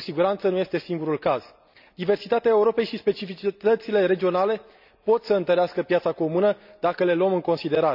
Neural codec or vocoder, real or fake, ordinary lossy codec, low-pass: none; real; none; 5.4 kHz